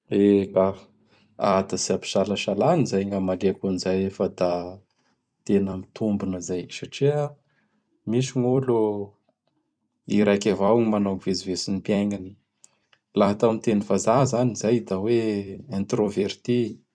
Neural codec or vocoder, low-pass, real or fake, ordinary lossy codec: vocoder, 44.1 kHz, 128 mel bands every 256 samples, BigVGAN v2; 9.9 kHz; fake; none